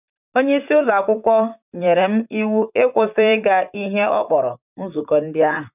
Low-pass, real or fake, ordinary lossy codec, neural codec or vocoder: 3.6 kHz; fake; none; vocoder, 44.1 kHz, 128 mel bands, Pupu-Vocoder